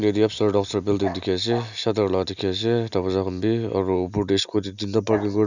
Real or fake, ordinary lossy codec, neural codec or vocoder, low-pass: real; none; none; 7.2 kHz